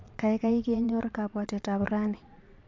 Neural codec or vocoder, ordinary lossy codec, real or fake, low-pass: vocoder, 22.05 kHz, 80 mel bands, Vocos; MP3, 64 kbps; fake; 7.2 kHz